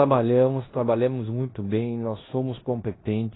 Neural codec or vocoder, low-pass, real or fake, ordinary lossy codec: codec, 16 kHz in and 24 kHz out, 0.9 kbps, LongCat-Audio-Codec, four codebook decoder; 7.2 kHz; fake; AAC, 16 kbps